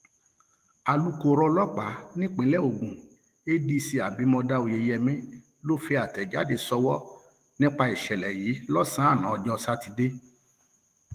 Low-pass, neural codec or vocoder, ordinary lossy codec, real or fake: 14.4 kHz; autoencoder, 48 kHz, 128 numbers a frame, DAC-VAE, trained on Japanese speech; Opus, 24 kbps; fake